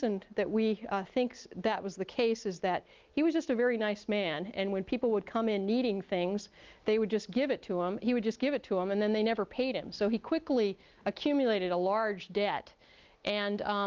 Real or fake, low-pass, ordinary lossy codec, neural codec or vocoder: real; 7.2 kHz; Opus, 32 kbps; none